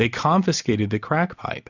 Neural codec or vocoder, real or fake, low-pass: none; real; 7.2 kHz